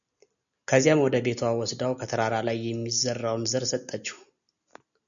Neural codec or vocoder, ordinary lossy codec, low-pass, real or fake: none; MP3, 96 kbps; 7.2 kHz; real